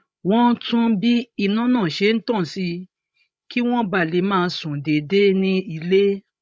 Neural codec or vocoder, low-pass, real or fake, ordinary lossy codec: codec, 16 kHz, 16 kbps, FreqCodec, larger model; none; fake; none